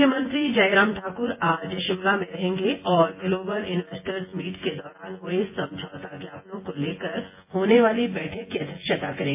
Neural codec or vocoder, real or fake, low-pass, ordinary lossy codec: vocoder, 24 kHz, 100 mel bands, Vocos; fake; 3.6 kHz; MP3, 24 kbps